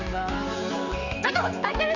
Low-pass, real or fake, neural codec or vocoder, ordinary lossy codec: 7.2 kHz; fake; codec, 16 kHz, 4 kbps, X-Codec, HuBERT features, trained on general audio; none